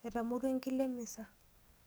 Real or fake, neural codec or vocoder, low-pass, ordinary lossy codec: fake; codec, 44.1 kHz, 7.8 kbps, DAC; none; none